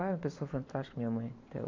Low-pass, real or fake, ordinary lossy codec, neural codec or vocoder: 7.2 kHz; real; MP3, 48 kbps; none